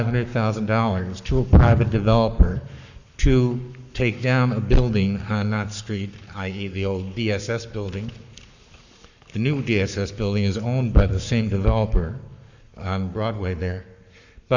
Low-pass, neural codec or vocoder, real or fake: 7.2 kHz; codec, 44.1 kHz, 7.8 kbps, Pupu-Codec; fake